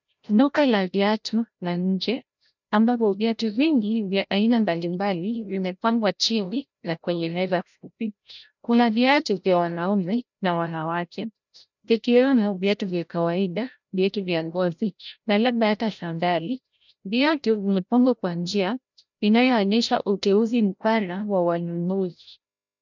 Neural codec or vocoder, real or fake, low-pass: codec, 16 kHz, 0.5 kbps, FreqCodec, larger model; fake; 7.2 kHz